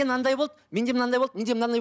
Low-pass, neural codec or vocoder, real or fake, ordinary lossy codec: none; none; real; none